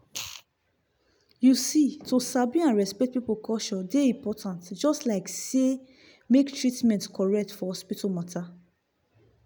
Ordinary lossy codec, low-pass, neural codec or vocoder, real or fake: none; none; none; real